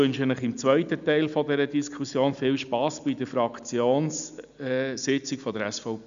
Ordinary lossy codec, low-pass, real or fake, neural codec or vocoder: AAC, 96 kbps; 7.2 kHz; real; none